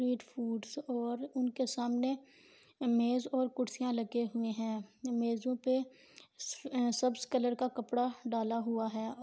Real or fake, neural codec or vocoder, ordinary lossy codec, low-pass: real; none; none; none